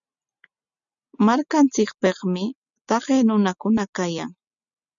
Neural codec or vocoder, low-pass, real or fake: none; 7.2 kHz; real